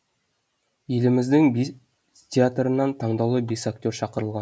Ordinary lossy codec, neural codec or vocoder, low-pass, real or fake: none; none; none; real